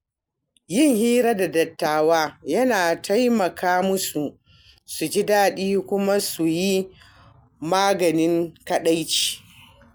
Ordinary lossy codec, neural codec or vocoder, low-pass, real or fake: none; none; none; real